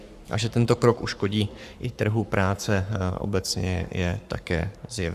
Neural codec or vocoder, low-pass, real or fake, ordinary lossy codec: codec, 44.1 kHz, 7.8 kbps, Pupu-Codec; 14.4 kHz; fake; Opus, 64 kbps